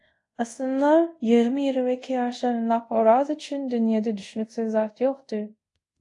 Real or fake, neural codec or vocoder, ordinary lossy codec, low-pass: fake; codec, 24 kHz, 0.5 kbps, DualCodec; AAC, 48 kbps; 10.8 kHz